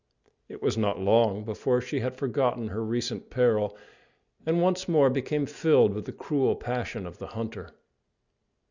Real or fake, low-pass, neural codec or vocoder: real; 7.2 kHz; none